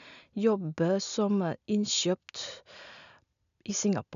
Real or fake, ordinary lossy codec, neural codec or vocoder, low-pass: real; none; none; 7.2 kHz